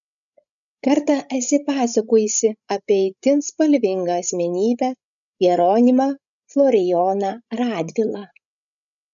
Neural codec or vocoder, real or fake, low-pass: codec, 16 kHz, 16 kbps, FreqCodec, larger model; fake; 7.2 kHz